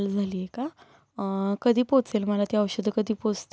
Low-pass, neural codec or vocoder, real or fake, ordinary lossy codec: none; none; real; none